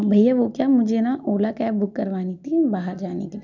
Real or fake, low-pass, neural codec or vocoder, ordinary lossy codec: real; 7.2 kHz; none; none